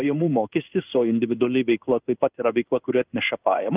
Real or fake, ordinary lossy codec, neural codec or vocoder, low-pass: fake; Opus, 24 kbps; codec, 16 kHz in and 24 kHz out, 1 kbps, XY-Tokenizer; 3.6 kHz